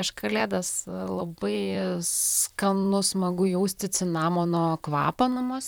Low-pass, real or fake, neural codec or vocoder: 19.8 kHz; fake; vocoder, 44.1 kHz, 128 mel bands, Pupu-Vocoder